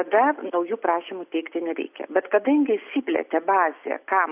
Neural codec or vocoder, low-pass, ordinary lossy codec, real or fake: none; 3.6 kHz; AAC, 32 kbps; real